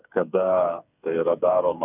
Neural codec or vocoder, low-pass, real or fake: codec, 16 kHz, 4 kbps, FreqCodec, smaller model; 3.6 kHz; fake